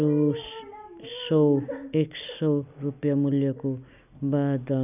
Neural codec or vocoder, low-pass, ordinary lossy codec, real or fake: none; 3.6 kHz; none; real